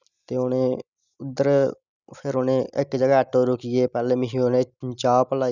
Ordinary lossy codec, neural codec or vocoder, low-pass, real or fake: none; none; 7.2 kHz; real